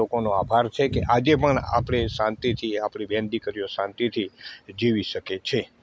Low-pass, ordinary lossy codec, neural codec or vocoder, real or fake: none; none; none; real